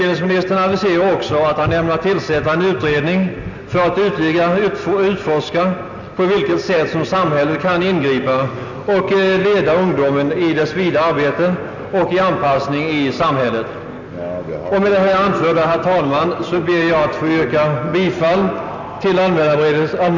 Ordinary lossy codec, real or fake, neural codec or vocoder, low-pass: none; real; none; 7.2 kHz